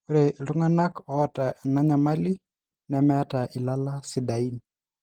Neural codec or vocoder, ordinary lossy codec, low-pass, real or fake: none; Opus, 16 kbps; 19.8 kHz; real